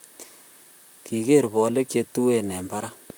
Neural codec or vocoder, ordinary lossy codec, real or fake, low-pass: vocoder, 44.1 kHz, 128 mel bands, Pupu-Vocoder; none; fake; none